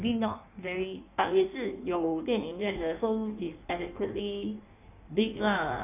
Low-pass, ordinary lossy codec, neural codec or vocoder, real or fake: 3.6 kHz; none; codec, 16 kHz in and 24 kHz out, 1.1 kbps, FireRedTTS-2 codec; fake